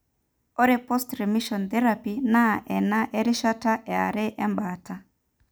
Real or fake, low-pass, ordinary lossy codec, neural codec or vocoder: real; none; none; none